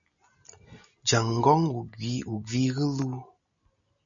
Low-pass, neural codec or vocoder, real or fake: 7.2 kHz; none; real